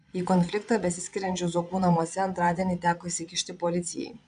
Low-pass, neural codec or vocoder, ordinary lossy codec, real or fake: 9.9 kHz; vocoder, 22.05 kHz, 80 mel bands, Vocos; MP3, 96 kbps; fake